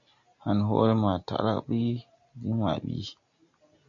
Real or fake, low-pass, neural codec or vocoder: real; 7.2 kHz; none